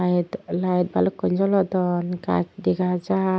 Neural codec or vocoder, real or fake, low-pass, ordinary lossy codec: none; real; none; none